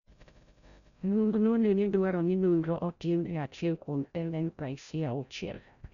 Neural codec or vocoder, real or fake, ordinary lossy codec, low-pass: codec, 16 kHz, 0.5 kbps, FreqCodec, larger model; fake; none; 7.2 kHz